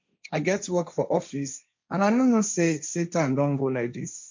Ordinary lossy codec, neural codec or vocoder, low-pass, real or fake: MP3, 48 kbps; codec, 16 kHz, 1.1 kbps, Voila-Tokenizer; 7.2 kHz; fake